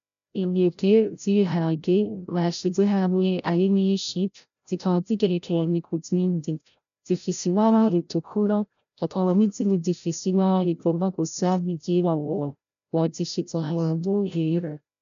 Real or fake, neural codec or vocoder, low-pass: fake; codec, 16 kHz, 0.5 kbps, FreqCodec, larger model; 7.2 kHz